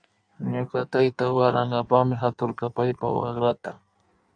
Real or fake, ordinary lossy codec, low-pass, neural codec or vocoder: fake; AAC, 64 kbps; 9.9 kHz; codec, 16 kHz in and 24 kHz out, 1.1 kbps, FireRedTTS-2 codec